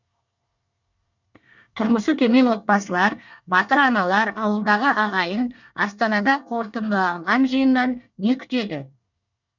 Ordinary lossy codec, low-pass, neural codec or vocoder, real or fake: none; 7.2 kHz; codec, 24 kHz, 1 kbps, SNAC; fake